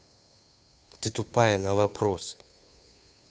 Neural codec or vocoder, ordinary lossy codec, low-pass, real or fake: codec, 16 kHz, 2 kbps, FunCodec, trained on Chinese and English, 25 frames a second; none; none; fake